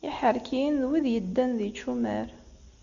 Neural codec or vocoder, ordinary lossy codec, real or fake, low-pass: none; Opus, 64 kbps; real; 7.2 kHz